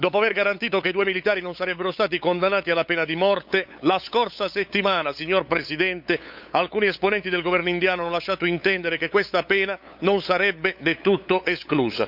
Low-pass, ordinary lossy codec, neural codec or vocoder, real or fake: 5.4 kHz; none; codec, 16 kHz, 8 kbps, FunCodec, trained on LibriTTS, 25 frames a second; fake